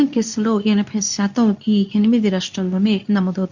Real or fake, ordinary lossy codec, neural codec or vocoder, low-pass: fake; none; codec, 24 kHz, 0.9 kbps, WavTokenizer, medium speech release version 2; 7.2 kHz